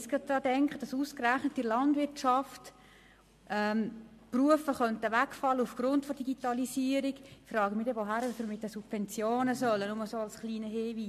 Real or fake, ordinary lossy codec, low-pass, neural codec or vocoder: real; MP3, 64 kbps; 14.4 kHz; none